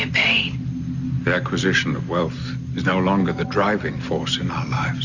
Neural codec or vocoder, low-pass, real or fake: none; 7.2 kHz; real